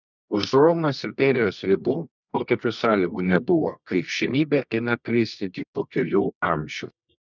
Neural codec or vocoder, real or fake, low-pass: codec, 24 kHz, 0.9 kbps, WavTokenizer, medium music audio release; fake; 7.2 kHz